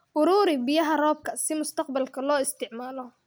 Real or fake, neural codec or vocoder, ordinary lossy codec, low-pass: real; none; none; none